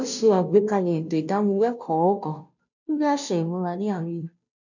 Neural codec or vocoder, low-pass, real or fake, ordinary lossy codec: codec, 16 kHz, 0.5 kbps, FunCodec, trained on Chinese and English, 25 frames a second; 7.2 kHz; fake; none